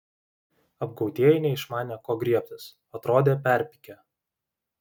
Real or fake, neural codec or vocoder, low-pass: real; none; 19.8 kHz